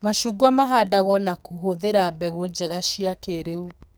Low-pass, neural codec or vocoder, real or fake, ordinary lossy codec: none; codec, 44.1 kHz, 2.6 kbps, SNAC; fake; none